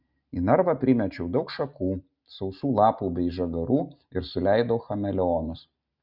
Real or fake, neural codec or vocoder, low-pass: real; none; 5.4 kHz